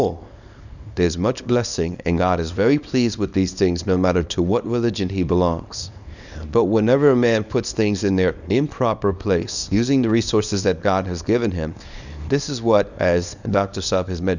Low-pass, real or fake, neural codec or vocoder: 7.2 kHz; fake; codec, 24 kHz, 0.9 kbps, WavTokenizer, small release